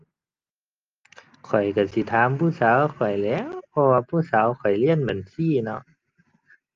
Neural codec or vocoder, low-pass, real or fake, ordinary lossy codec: none; 7.2 kHz; real; Opus, 32 kbps